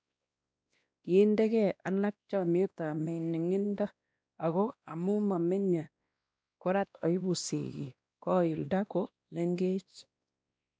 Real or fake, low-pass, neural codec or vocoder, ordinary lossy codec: fake; none; codec, 16 kHz, 1 kbps, X-Codec, WavLM features, trained on Multilingual LibriSpeech; none